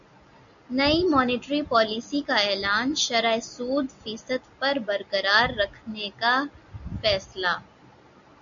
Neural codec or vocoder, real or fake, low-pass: none; real; 7.2 kHz